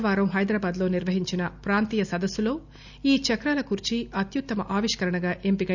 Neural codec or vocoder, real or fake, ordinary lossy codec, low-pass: none; real; none; 7.2 kHz